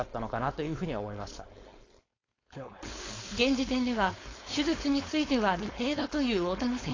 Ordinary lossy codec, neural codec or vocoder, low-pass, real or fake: AAC, 32 kbps; codec, 16 kHz, 4.8 kbps, FACodec; 7.2 kHz; fake